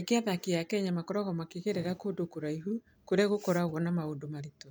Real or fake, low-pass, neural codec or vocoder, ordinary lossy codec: real; none; none; none